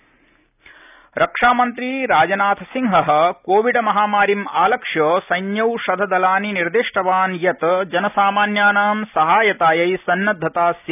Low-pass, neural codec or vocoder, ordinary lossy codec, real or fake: 3.6 kHz; none; none; real